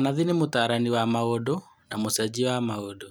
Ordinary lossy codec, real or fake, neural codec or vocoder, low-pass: none; real; none; none